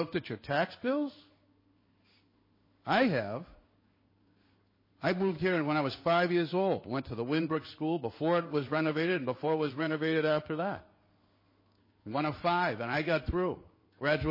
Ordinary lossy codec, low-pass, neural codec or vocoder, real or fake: MP3, 24 kbps; 5.4 kHz; codec, 16 kHz in and 24 kHz out, 1 kbps, XY-Tokenizer; fake